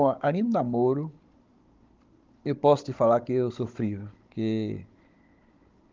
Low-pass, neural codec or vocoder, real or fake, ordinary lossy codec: 7.2 kHz; codec, 16 kHz, 16 kbps, FunCodec, trained on Chinese and English, 50 frames a second; fake; Opus, 32 kbps